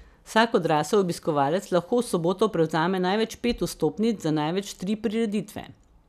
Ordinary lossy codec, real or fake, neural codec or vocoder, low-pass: none; real; none; 14.4 kHz